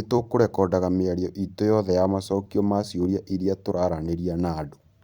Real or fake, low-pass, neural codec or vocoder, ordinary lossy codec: fake; 19.8 kHz; vocoder, 44.1 kHz, 128 mel bands every 256 samples, BigVGAN v2; Opus, 64 kbps